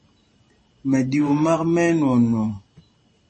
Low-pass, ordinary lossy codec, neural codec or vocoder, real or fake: 10.8 kHz; MP3, 32 kbps; vocoder, 44.1 kHz, 128 mel bands every 512 samples, BigVGAN v2; fake